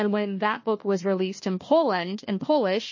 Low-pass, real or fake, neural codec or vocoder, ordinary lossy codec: 7.2 kHz; fake; codec, 16 kHz, 1 kbps, FunCodec, trained on Chinese and English, 50 frames a second; MP3, 32 kbps